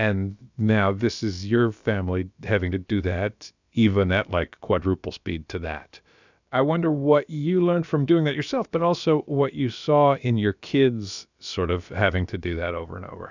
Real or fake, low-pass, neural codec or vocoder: fake; 7.2 kHz; codec, 16 kHz, about 1 kbps, DyCAST, with the encoder's durations